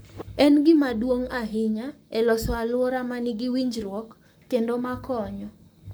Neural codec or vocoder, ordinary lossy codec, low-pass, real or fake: codec, 44.1 kHz, 7.8 kbps, Pupu-Codec; none; none; fake